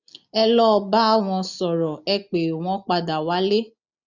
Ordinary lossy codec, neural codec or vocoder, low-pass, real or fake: none; none; 7.2 kHz; real